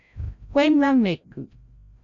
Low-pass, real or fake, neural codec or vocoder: 7.2 kHz; fake; codec, 16 kHz, 0.5 kbps, FreqCodec, larger model